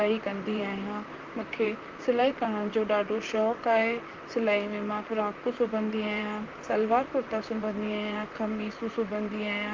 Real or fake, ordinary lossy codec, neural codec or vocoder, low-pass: fake; Opus, 24 kbps; vocoder, 44.1 kHz, 128 mel bands, Pupu-Vocoder; 7.2 kHz